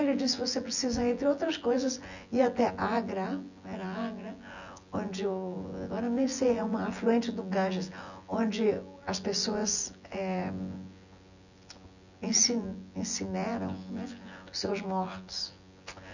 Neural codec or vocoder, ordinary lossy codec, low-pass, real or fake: vocoder, 24 kHz, 100 mel bands, Vocos; none; 7.2 kHz; fake